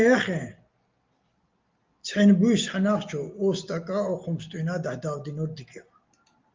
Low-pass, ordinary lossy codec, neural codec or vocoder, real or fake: 7.2 kHz; Opus, 24 kbps; none; real